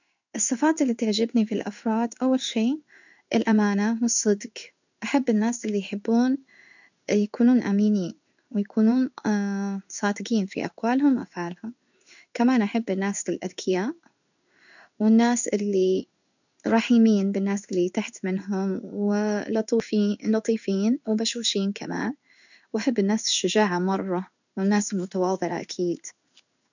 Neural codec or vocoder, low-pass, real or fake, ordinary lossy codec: codec, 16 kHz in and 24 kHz out, 1 kbps, XY-Tokenizer; 7.2 kHz; fake; none